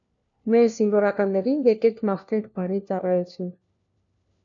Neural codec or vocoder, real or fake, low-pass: codec, 16 kHz, 1 kbps, FunCodec, trained on LibriTTS, 50 frames a second; fake; 7.2 kHz